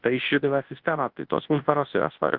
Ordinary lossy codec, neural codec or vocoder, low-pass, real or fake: Opus, 16 kbps; codec, 24 kHz, 0.9 kbps, WavTokenizer, large speech release; 5.4 kHz; fake